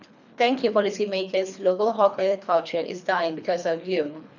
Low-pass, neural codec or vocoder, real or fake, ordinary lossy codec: 7.2 kHz; codec, 24 kHz, 3 kbps, HILCodec; fake; none